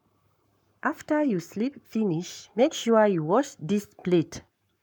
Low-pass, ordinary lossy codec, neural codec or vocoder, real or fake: 19.8 kHz; none; codec, 44.1 kHz, 7.8 kbps, Pupu-Codec; fake